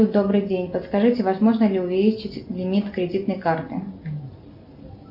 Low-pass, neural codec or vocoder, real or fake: 5.4 kHz; none; real